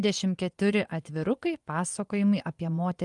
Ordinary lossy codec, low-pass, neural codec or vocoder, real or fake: Opus, 32 kbps; 10.8 kHz; vocoder, 24 kHz, 100 mel bands, Vocos; fake